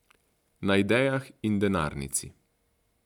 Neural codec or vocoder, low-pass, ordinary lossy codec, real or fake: none; 19.8 kHz; none; real